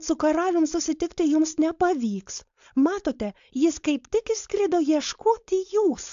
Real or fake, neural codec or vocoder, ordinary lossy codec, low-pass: fake; codec, 16 kHz, 4.8 kbps, FACodec; AAC, 64 kbps; 7.2 kHz